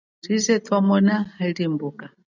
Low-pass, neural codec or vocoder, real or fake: 7.2 kHz; none; real